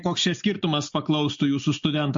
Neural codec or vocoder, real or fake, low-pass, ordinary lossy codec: none; real; 7.2 kHz; MP3, 48 kbps